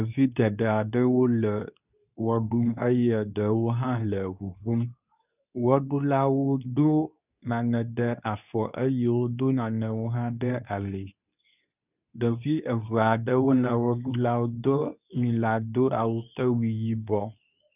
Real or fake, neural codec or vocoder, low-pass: fake; codec, 24 kHz, 0.9 kbps, WavTokenizer, medium speech release version 2; 3.6 kHz